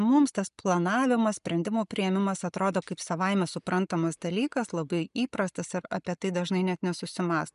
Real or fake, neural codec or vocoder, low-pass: real; none; 10.8 kHz